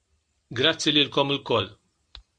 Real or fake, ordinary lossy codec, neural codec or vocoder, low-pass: real; MP3, 48 kbps; none; 9.9 kHz